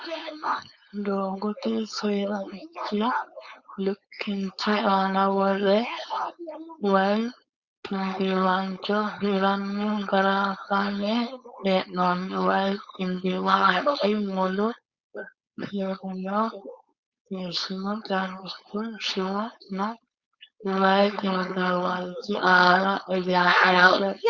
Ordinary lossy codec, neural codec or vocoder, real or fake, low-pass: Opus, 64 kbps; codec, 16 kHz, 4.8 kbps, FACodec; fake; 7.2 kHz